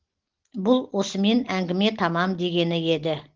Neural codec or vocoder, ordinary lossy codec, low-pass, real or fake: none; Opus, 16 kbps; 7.2 kHz; real